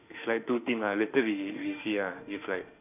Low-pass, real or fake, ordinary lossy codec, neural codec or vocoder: 3.6 kHz; fake; none; autoencoder, 48 kHz, 32 numbers a frame, DAC-VAE, trained on Japanese speech